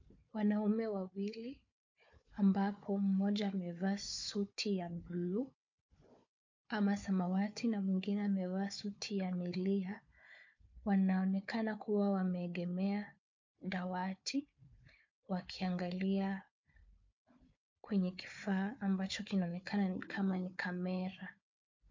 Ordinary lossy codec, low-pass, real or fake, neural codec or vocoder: MP3, 48 kbps; 7.2 kHz; fake; codec, 16 kHz, 4 kbps, FunCodec, trained on Chinese and English, 50 frames a second